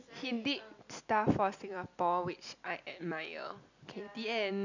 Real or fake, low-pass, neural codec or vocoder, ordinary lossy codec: real; 7.2 kHz; none; none